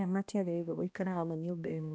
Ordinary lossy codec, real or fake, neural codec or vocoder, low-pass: none; fake; codec, 16 kHz, 1 kbps, X-Codec, HuBERT features, trained on balanced general audio; none